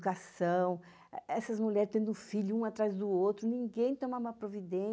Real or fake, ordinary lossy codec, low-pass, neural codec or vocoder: real; none; none; none